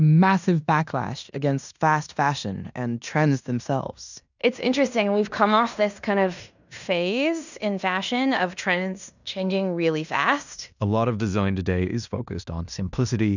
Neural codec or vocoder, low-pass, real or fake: codec, 16 kHz in and 24 kHz out, 0.9 kbps, LongCat-Audio-Codec, fine tuned four codebook decoder; 7.2 kHz; fake